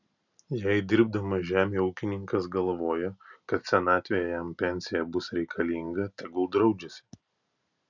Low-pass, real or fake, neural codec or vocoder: 7.2 kHz; real; none